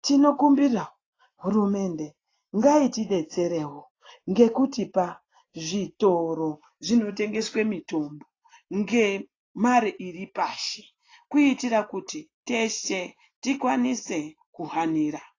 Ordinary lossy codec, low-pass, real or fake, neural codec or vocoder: AAC, 32 kbps; 7.2 kHz; real; none